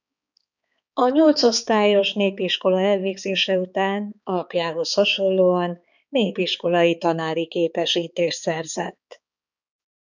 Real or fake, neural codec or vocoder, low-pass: fake; codec, 16 kHz, 4 kbps, X-Codec, HuBERT features, trained on balanced general audio; 7.2 kHz